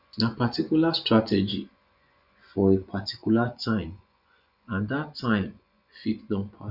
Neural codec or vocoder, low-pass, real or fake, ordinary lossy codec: vocoder, 24 kHz, 100 mel bands, Vocos; 5.4 kHz; fake; none